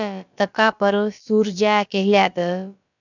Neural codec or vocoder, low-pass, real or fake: codec, 16 kHz, about 1 kbps, DyCAST, with the encoder's durations; 7.2 kHz; fake